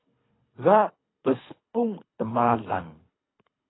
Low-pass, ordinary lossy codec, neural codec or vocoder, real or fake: 7.2 kHz; AAC, 16 kbps; codec, 24 kHz, 3 kbps, HILCodec; fake